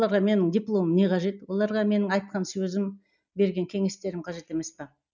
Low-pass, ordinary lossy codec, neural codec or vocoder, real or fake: 7.2 kHz; none; none; real